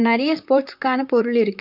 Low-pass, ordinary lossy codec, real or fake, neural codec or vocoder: 5.4 kHz; none; fake; vocoder, 44.1 kHz, 128 mel bands, Pupu-Vocoder